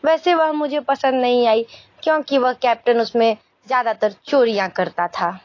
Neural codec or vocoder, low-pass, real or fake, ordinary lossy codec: none; 7.2 kHz; real; AAC, 32 kbps